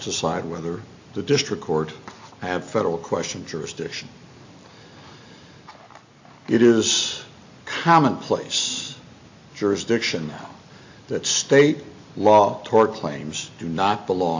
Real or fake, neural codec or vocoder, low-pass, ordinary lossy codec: real; none; 7.2 kHz; AAC, 48 kbps